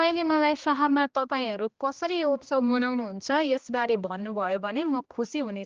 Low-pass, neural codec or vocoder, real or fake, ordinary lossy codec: 7.2 kHz; codec, 16 kHz, 1 kbps, X-Codec, HuBERT features, trained on general audio; fake; Opus, 24 kbps